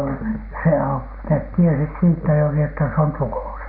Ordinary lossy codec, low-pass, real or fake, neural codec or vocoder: none; 5.4 kHz; real; none